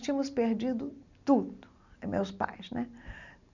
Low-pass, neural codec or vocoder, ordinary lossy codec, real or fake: 7.2 kHz; none; none; real